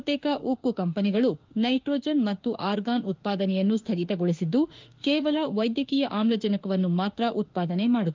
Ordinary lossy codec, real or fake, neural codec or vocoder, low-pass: Opus, 24 kbps; fake; autoencoder, 48 kHz, 32 numbers a frame, DAC-VAE, trained on Japanese speech; 7.2 kHz